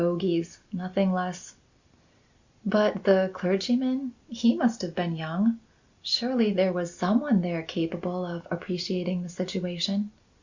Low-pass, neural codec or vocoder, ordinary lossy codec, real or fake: 7.2 kHz; none; Opus, 64 kbps; real